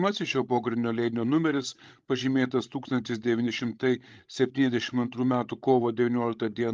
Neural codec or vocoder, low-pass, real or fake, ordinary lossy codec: codec, 16 kHz, 16 kbps, FreqCodec, larger model; 7.2 kHz; fake; Opus, 32 kbps